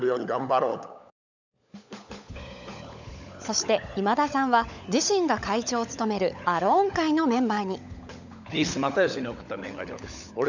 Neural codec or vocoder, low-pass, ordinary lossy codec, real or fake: codec, 16 kHz, 16 kbps, FunCodec, trained on LibriTTS, 50 frames a second; 7.2 kHz; none; fake